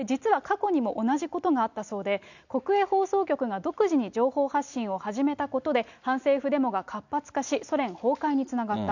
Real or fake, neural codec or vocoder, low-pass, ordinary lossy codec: real; none; 7.2 kHz; none